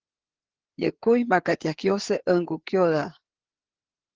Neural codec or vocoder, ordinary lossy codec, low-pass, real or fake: codec, 16 kHz, 4 kbps, FreqCodec, larger model; Opus, 16 kbps; 7.2 kHz; fake